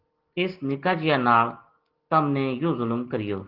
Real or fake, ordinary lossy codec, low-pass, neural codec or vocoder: real; Opus, 16 kbps; 5.4 kHz; none